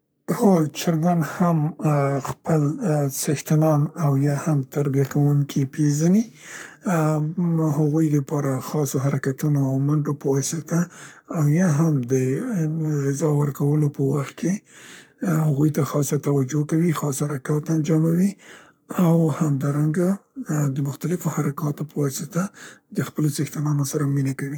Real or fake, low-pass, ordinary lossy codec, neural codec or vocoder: fake; none; none; codec, 44.1 kHz, 3.4 kbps, Pupu-Codec